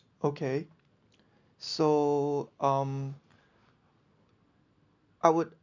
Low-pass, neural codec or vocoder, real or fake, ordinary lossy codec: 7.2 kHz; none; real; none